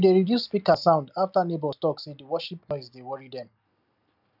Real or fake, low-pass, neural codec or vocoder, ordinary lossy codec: real; 5.4 kHz; none; none